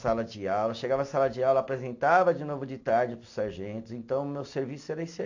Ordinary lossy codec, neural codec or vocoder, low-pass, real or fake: AAC, 48 kbps; none; 7.2 kHz; real